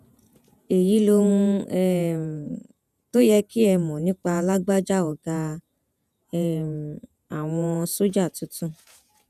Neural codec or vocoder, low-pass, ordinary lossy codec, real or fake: vocoder, 48 kHz, 128 mel bands, Vocos; 14.4 kHz; none; fake